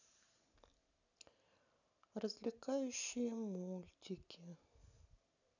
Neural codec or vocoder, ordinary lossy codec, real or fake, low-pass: none; none; real; 7.2 kHz